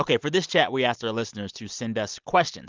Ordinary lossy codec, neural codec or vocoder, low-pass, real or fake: Opus, 24 kbps; none; 7.2 kHz; real